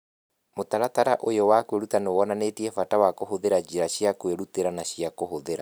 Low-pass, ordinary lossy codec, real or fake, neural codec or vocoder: none; none; real; none